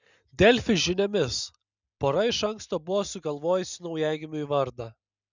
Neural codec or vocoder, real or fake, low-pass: none; real; 7.2 kHz